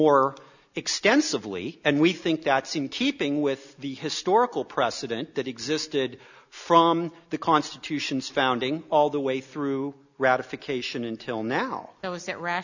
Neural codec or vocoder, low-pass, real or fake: none; 7.2 kHz; real